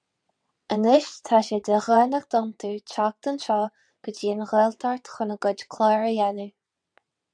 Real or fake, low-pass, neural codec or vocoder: fake; 9.9 kHz; vocoder, 22.05 kHz, 80 mel bands, WaveNeXt